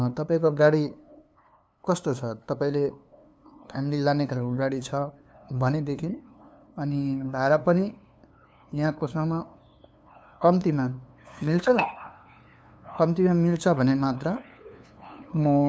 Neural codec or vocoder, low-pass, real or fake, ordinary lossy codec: codec, 16 kHz, 2 kbps, FunCodec, trained on LibriTTS, 25 frames a second; none; fake; none